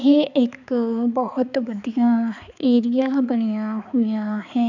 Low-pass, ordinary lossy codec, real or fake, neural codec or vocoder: 7.2 kHz; none; fake; codec, 16 kHz, 4 kbps, X-Codec, HuBERT features, trained on balanced general audio